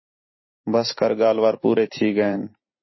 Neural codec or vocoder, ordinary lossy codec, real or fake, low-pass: vocoder, 44.1 kHz, 128 mel bands every 512 samples, BigVGAN v2; MP3, 24 kbps; fake; 7.2 kHz